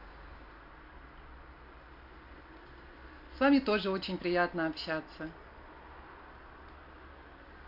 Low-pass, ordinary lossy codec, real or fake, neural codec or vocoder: 5.4 kHz; MP3, 48 kbps; real; none